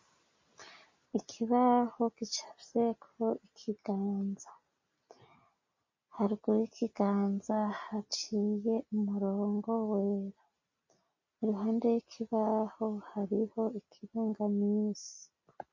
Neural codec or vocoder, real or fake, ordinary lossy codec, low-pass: none; real; MP3, 32 kbps; 7.2 kHz